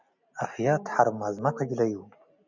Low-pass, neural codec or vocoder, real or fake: 7.2 kHz; none; real